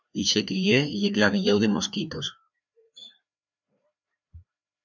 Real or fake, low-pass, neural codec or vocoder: fake; 7.2 kHz; codec, 16 kHz, 2 kbps, FreqCodec, larger model